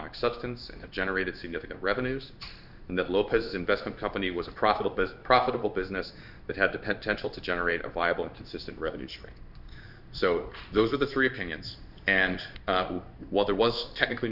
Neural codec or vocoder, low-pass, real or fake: codec, 16 kHz in and 24 kHz out, 1 kbps, XY-Tokenizer; 5.4 kHz; fake